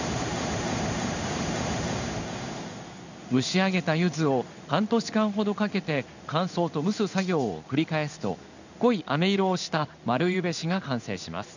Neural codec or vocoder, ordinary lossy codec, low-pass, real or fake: codec, 16 kHz in and 24 kHz out, 1 kbps, XY-Tokenizer; none; 7.2 kHz; fake